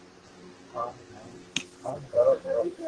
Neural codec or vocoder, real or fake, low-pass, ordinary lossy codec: codec, 44.1 kHz, 2.6 kbps, SNAC; fake; 9.9 kHz; Opus, 16 kbps